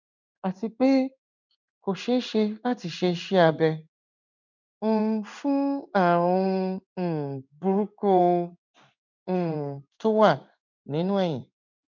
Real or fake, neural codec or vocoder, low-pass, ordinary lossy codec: fake; codec, 16 kHz in and 24 kHz out, 1 kbps, XY-Tokenizer; 7.2 kHz; none